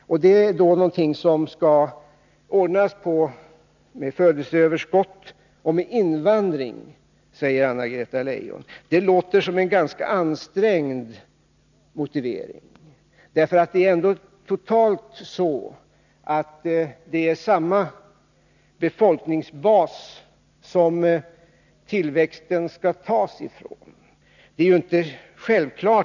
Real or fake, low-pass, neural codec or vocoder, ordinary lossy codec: real; 7.2 kHz; none; none